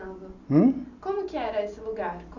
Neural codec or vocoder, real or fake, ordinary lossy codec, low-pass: none; real; none; 7.2 kHz